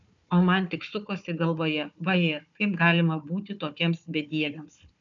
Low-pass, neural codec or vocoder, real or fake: 7.2 kHz; codec, 16 kHz, 4 kbps, FunCodec, trained on Chinese and English, 50 frames a second; fake